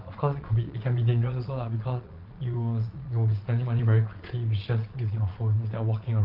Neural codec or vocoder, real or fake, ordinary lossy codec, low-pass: vocoder, 22.05 kHz, 80 mel bands, Vocos; fake; Opus, 24 kbps; 5.4 kHz